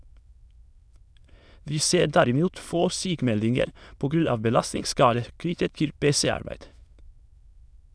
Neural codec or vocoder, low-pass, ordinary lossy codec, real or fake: autoencoder, 22.05 kHz, a latent of 192 numbers a frame, VITS, trained on many speakers; none; none; fake